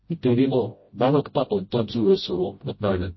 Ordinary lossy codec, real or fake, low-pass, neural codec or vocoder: MP3, 24 kbps; fake; 7.2 kHz; codec, 16 kHz, 0.5 kbps, FreqCodec, smaller model